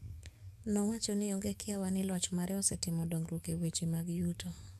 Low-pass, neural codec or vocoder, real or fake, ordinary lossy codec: 14.4 kHz; codec, 44.1 kHz, 7.8 kbps, DAC; fake; AAC, 96 kbps